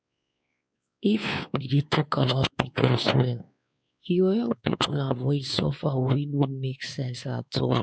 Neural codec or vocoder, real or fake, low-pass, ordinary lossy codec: codec, 16 kHz, 2 kbps, X-Codec, WavLM features, trained on Multilingual LibriSpeech; fake; none; none